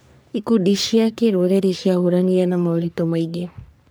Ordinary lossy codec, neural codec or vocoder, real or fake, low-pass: none; codec, 44.1 kHz, 3.4 kbps, Pupu-Codec; fake; none